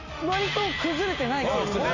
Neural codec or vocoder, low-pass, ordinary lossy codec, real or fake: none; 7.2 kHz; none; real